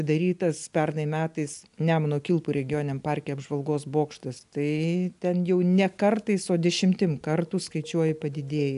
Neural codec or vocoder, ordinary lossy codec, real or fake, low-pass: none; AAC, 96 kbps; real; 10.8 kHz